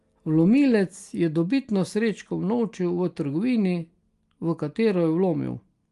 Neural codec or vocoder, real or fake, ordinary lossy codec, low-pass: none; real; Opus, 32 kbps; 10.8 kHz